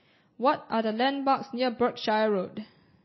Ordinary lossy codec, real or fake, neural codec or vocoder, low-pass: MP3, 24 kbps; real; none; 7.2 kHz